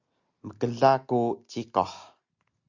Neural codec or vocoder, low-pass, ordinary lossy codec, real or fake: none; 7.2 kHz; Opus, 64 kbps; real